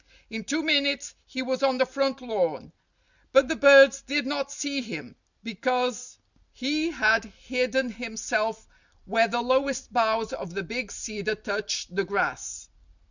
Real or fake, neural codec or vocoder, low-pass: real; none; 7.2 kHz